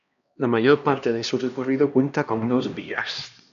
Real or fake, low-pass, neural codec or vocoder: fake; 7.2 kHz; codec, 16 kHz, 1 kbps, X-Codec, HuBERT features, trained on LibriSpeech